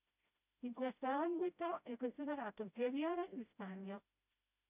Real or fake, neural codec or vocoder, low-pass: fake; codec, 16 kHz, 0.5 kbps, FreqCodec, smaller model; 3.6 kHz